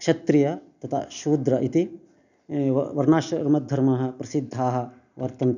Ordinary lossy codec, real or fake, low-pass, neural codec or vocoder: none; real; 7.2 kHz; none